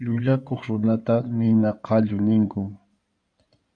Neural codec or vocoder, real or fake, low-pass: codec, 16 kHz in and 24 kHz out, 2.2 kbps, FireRedTTS-2 codec; fake; 9.9 kHz